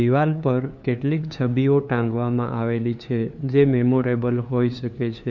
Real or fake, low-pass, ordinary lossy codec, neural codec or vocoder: fake; 7.2 kHz; Opus, 64 kbps; codec, 16 kHz, 2 kbps, FunCodec, trained on LibriTTS, 25 frames a second